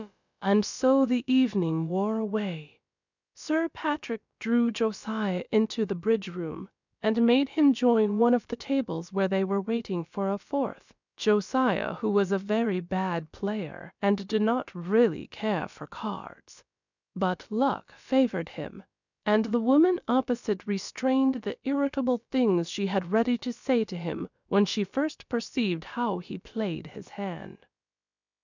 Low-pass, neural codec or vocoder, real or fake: 7.2 kHz; codec, 16 kHz, about 1 kbps, DyCAST, with the encoder's durations; fake